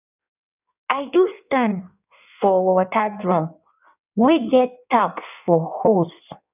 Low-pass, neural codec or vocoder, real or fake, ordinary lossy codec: 3.6 kHz; codec, 16 kHz in and 24 kHz out, 1.1 kbps, FireRedTTS-2 codec; fake; none